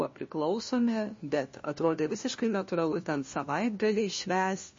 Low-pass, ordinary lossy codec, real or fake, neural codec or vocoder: 7.2 kHz; MP3, 32 kbps; fake; codec, 16 kHz, 1 kbps, FunCodec, trained on LibriTTS, 50 frames a second